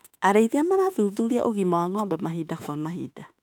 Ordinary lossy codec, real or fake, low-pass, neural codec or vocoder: none; fake; 19.8 kHz; autoencoder, 48 kHz, 32 numbers a frame, DAC-VAE, trained on Japanese speech